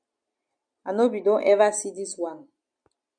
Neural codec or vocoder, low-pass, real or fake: none; 9.9 kHz; real